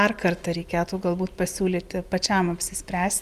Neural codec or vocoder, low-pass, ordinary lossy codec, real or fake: none; 14.4 kHz; Opus, 32 kbps; real